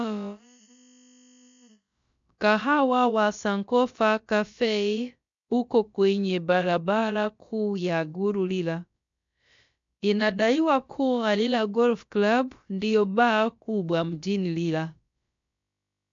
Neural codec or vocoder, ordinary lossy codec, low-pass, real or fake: codec, 16 kHz, about 1 kbps, DyCAST, with the encoder's durations; MP3, 64 kbps; 7.2 kHz; fake